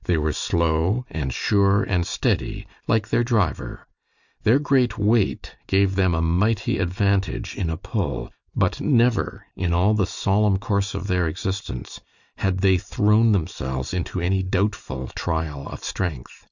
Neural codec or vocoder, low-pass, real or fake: none; 7.2 kHz; real